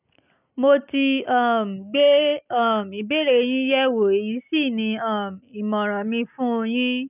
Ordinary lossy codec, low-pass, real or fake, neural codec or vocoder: none; 3.6 kHz; real; none